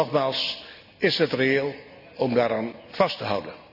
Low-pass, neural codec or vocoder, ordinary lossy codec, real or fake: 5.4 kHz; none; none; real